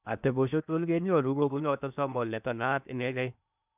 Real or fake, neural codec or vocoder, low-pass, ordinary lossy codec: fake; codec, 16 kHz in and 24 kHz out, 0.8 kbps, FocalCodec, streaming, 65536 codes; 3.6 kHz; none